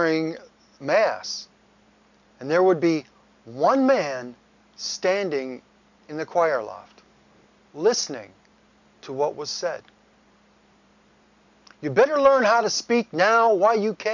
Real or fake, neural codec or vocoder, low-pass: real; none; 7.2 kHz